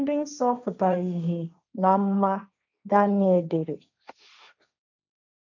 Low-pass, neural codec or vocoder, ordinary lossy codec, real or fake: 7.2 kHz; codec, 16 kHz, 1.1 kbps, Voila-Tokenizer; none; fake